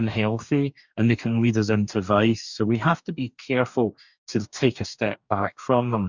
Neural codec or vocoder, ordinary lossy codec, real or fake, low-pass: codec, 44.1 kHz, 2.6 kbps, DAC; Opus, 64 kbps; fake; 7.2 kHz